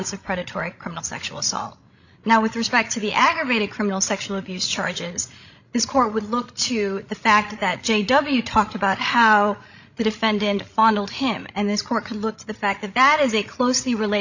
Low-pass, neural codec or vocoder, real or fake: 7.2 kHz; codec, 16 kHz, 16 kbps, FreqCodec, larger model; fake